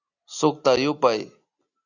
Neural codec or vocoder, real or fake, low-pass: none; real; 7.2 kHz